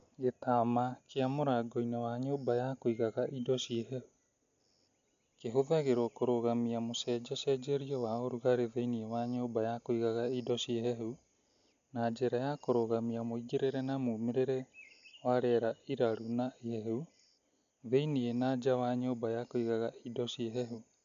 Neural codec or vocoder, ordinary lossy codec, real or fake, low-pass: none; MP3, 64 kbps; real; 7.2 kHz